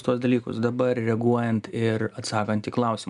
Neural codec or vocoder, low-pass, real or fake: none; 10.8 kHz; real